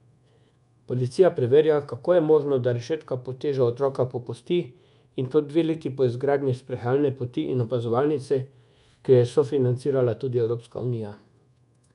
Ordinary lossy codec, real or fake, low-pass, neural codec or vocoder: none; fake; 10.8 kHz; codec, 24 kHz, 1.2 kbps, DualCodec